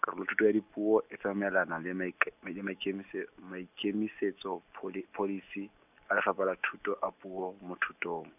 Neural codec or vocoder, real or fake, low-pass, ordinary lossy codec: none; real; 3.6 kHz; none